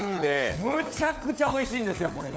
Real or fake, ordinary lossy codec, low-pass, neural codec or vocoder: fake; none; none; codec, 16 kHz, 4 kbps, FunCodec, trained on LibriTTS, 50 frames a second